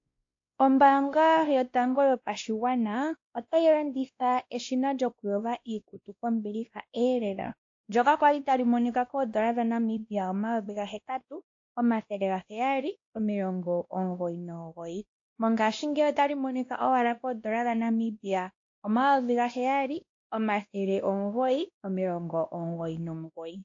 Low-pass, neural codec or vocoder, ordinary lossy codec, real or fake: 7.2 kHz; codec, 16 kHz, 1 kbps, X-Codec, WavLM features, trained on Multilingual LibriSpeech; AAC, 48 kbps; fake